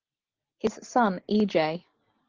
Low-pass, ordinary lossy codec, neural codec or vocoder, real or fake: 7.2 kHz; Opus, 16 kbps; none; real